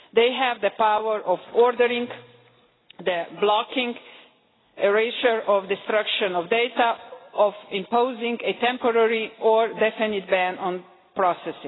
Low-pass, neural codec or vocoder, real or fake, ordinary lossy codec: 7.2 kHz; none; real; AAC, 16 kbps